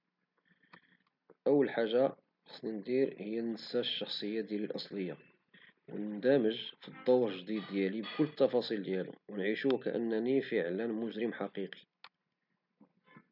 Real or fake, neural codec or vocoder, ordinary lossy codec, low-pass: real; none; none; 5.4 kHz